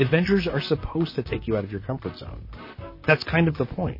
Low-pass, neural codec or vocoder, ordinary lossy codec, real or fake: 5.4 kHz; vocoder, 44.1 kHz, 128 mel bands, Pupu-Vocoder; MP3, 24 kbps; fake